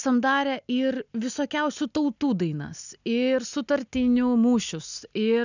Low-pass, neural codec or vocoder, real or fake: 7.2 kHz; none; real